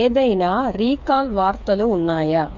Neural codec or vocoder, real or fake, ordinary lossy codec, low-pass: codec, 16 kHz, 4 kbps, FreqCodec, smaller model; fake; none; 7.2 kHz